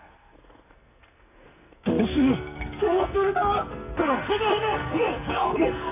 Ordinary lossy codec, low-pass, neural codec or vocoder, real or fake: none; 3.6 kHz; codec, 44.1 kHz, 2.6 kbps, SNAC; fake